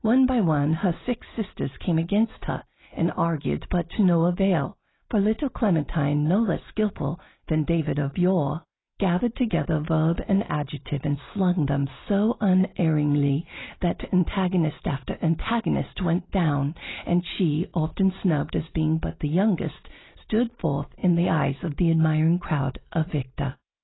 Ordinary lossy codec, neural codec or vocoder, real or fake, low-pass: AAC, 16 kbps; none; real; 7.2 kHz